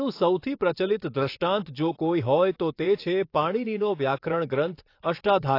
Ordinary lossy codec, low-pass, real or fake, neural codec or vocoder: AAC, 32 kbps; 5.4 kHz; fake; vocoder, 24 kHz, 100 mel bands, Vocos